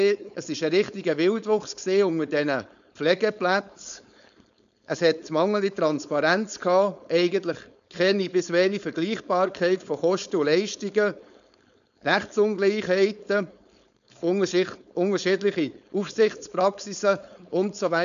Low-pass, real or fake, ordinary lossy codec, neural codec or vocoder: 7.2 kHz; fake; none; codec, 16 kHz, 4.8 kbps, FACodec